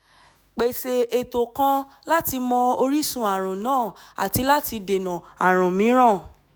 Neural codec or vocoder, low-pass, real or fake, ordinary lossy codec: autoencoder, 48 kHz, 128 numbers a frame, DAC-VAE, trained on Japanese speech; none; fake; none